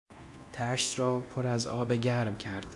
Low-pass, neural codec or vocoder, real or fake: 10.8 kHz; codec, 24 kHz, 0.9 kbps, DualCodec; fake